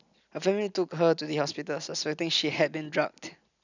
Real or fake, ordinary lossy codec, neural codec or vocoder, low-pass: real; none; none; 7.2 kHz